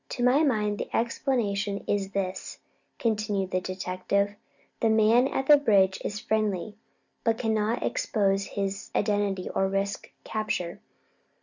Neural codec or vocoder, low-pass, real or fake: none; 7.2 kHz; real